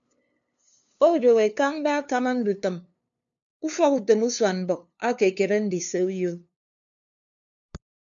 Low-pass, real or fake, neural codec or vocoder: 7.2 kHz; fake; codec, 16 kHz, 2 kbps, FunCodec, trained on LibriTTS, 25 frames a second